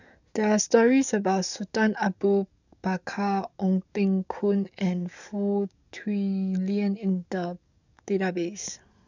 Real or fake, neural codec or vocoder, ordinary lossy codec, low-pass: fake; codec, 44.1 kHz, 7.8 kbps, DAC; none; 7.2 kHz